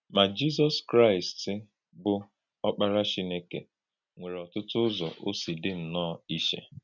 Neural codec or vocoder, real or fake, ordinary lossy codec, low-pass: none; real; none; 7.2 kHz